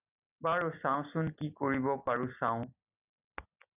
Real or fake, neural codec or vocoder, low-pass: real; none; 3.6 kHz